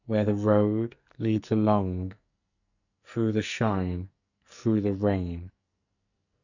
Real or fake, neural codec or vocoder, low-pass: fake; codec, 44.1 kHz, 2.6 kbps, SNAC; 7.2 kHz